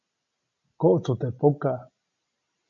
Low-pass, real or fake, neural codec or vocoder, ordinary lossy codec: 7.2 kHz; real; none; MP3, 64 kbps